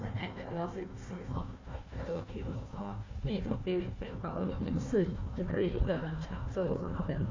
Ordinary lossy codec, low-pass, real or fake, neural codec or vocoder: MP3, 64 kbps; 7.2 kHz; fake; codec, 16 kHz, 1 kbps, FunCodec, trained on Chinese and English, 50 frames a second